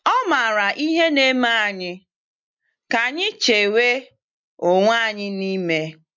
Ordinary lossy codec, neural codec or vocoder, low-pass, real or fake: MP3, 64 kbps; none; 7.2 kHz; real